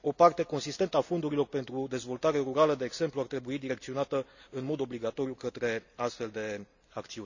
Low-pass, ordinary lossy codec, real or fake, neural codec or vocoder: 7.2 kHz; none; real; none